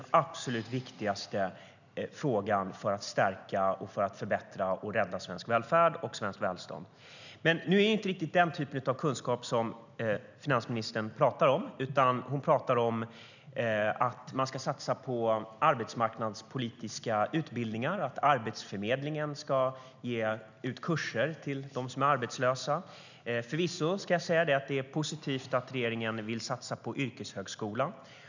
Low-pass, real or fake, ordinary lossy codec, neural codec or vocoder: 7.2 kHz; real; none; none